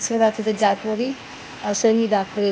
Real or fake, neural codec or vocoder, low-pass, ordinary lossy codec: fake; codec, 16 kHz, 0.8 kbps, ZipCodec; none; none